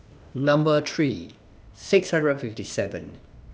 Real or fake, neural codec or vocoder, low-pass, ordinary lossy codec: fake; codec, 16 kHz, 0.8 kbps, ZipCodec; none; none